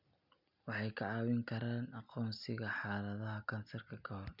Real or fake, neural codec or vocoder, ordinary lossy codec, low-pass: real; none; none; 5.4 kHz